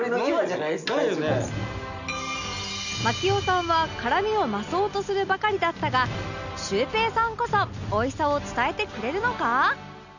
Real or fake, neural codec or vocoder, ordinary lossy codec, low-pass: real; none; none; 7.2 kHz